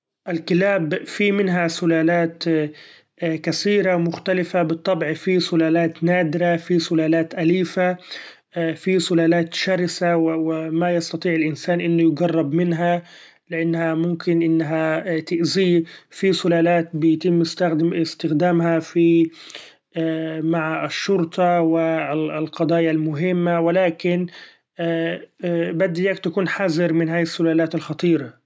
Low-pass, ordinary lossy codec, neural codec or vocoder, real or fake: none; none; none; real